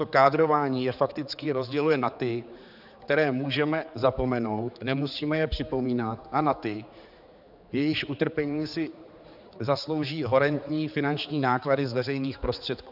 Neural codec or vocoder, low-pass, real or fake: codec, 16 kHz, 4 kbps, X-Codec, HuBERT features, trained on general audio; 5.4 kHz; fake